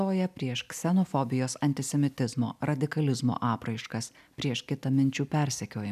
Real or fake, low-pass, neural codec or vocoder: real; 14.4 kHz; none